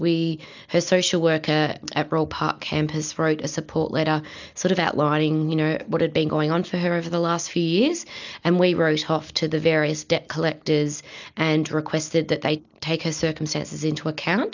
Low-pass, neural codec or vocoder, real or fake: 7.2 kHz; none; real